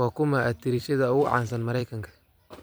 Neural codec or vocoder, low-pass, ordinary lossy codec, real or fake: vocoder, 44.1 kHz, 128 mel bands every 256 samples, BigVGAN v2; none; none; fake